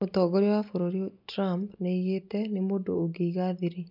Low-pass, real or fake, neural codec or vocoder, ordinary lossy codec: 5.4 kHz; real; none; none